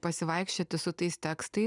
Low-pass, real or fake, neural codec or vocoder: 10.8 kHz; real; none